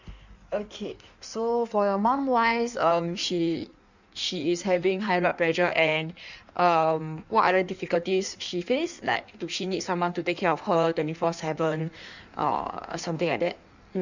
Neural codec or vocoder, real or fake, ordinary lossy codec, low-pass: codec, 16 kHz in and 24 kHz out, 1.1 kbps, FireRedTTS-2 codec; fake; none; 7.2 kHz